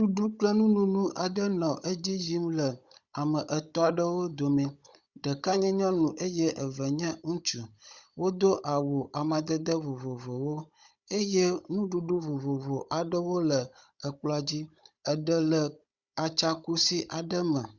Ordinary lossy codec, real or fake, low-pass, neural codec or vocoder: Opus, 64 kbps; fake; 7.2 kHz; codec, 16 kHz, 16 kbps, FunCodec, trained on Chinese and English, 50 frames a second